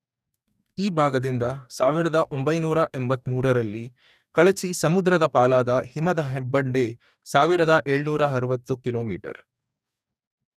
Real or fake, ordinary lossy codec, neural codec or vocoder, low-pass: fake; none; codec, 44.1 kHz, 2.6 kbps, DAC; 14.4 kHz